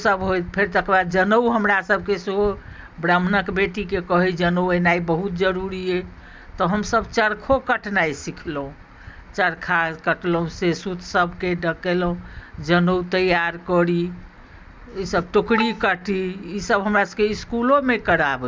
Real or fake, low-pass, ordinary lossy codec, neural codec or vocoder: real; none; none; none